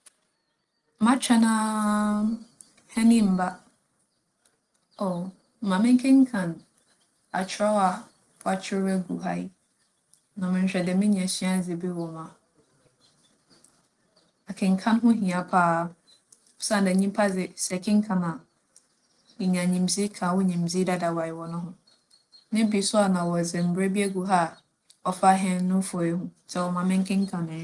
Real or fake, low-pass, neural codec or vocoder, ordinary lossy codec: real; 10.8 kHz; none; Opus, 24 kbps